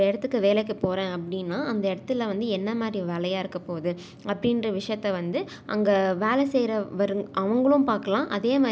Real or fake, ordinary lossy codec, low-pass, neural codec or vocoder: real; none; none; none